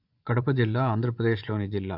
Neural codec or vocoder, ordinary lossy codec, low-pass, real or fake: vocoder, 24 kHz, 100 mel bands, Vocos; MP3, 48 kbps; 5.4 kHz; fake